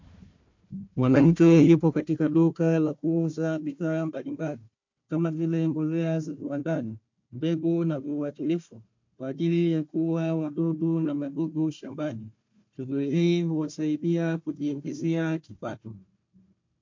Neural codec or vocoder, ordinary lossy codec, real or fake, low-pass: codec, 16 kHz, 1 kbps, FunCodec, trained on Chinese and English, 50 frames a second; MP3, 48 kbps; fake; 7.2 kHz